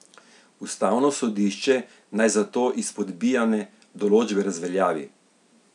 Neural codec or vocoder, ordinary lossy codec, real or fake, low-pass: none; none; real; 10.8 kHz